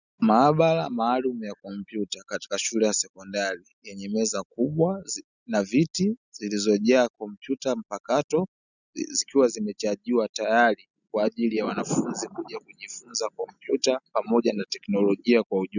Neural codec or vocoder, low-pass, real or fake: none; 7.2 kHz; real